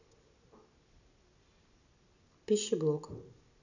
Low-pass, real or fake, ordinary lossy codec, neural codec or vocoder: 7.2 kHz; real; none; none